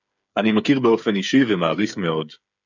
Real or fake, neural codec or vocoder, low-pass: fake; codec, 16 kHz, 8 kbps, FreqCodec, smaller model; 7.2 kHz